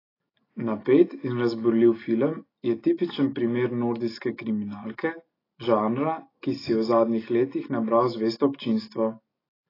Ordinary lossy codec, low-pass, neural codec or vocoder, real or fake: AAC, 24 kbps; 5.4 kHz; none; real